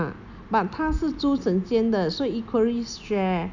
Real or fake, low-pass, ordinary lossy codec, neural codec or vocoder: real; 7.2 kHz; none; none